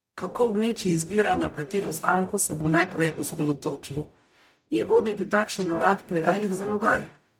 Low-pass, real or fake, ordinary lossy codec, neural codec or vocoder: 19.8 kHz; fake; MP3, 96 kbps; codec, 44.1 kHz, 0.9 kbps, DAC